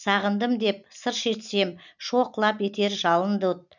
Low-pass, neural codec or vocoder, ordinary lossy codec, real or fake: 7.2 kHz; none; none; real